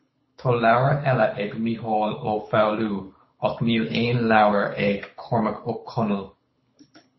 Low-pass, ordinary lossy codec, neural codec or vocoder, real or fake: 7.2 kHz; MP3, 24 kbps; codec, 24 kHz, 6 kbps, HILCodec; fake